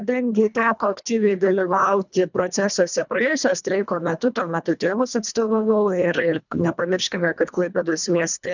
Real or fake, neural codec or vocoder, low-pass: fake; codec, 24 kHz, 1.5 kbps, HILCodec; 7.2 kHz